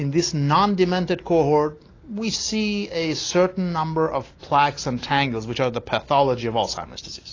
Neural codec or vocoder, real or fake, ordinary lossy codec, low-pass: none; real; AAC, 32 kbps; 7.2 kHz